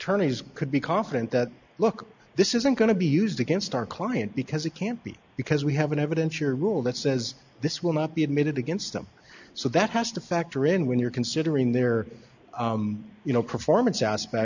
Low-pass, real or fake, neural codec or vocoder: 7.2 kHz; real; none